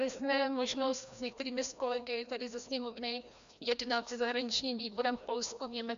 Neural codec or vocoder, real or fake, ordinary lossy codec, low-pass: codec, 16 kHz, 1 kbps, FreqCodec, larger model; fake; MP3, 64 kbps; 7.2 kHz